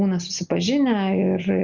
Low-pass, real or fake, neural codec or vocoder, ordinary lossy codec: 7.2 kHz; real; none; Opus, 64 kbps